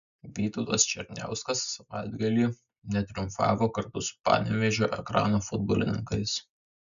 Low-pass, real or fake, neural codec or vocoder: 7.2 kHz; real; none